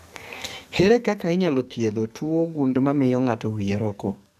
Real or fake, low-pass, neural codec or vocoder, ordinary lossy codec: fake; 14.4 kHz; codec, 44.1 kHz, 2.6 kbps, SNAC; none